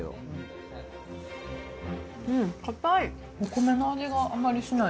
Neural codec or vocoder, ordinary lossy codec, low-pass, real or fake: none; none; none; real